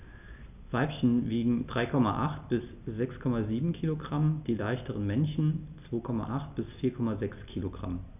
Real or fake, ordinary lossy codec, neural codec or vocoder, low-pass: real; none; none; 3.6 kHz